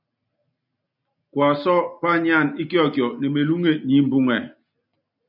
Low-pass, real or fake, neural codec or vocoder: 5.4 kHz; real; none